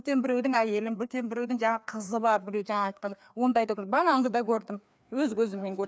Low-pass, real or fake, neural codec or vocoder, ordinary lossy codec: none; fake; codec, 16 kHz, 2 kbps, FreqCodec, larger model; none